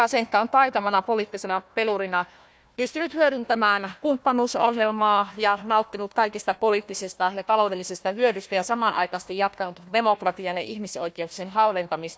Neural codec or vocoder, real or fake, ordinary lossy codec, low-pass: codec, 16 kHz, 1 kbps, FunCodec, trained on Chinese and English, 50 frames a second; fake; none; none